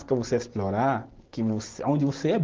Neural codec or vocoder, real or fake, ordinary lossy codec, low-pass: none; real; Opus, 16 kbps; 7.2 kHz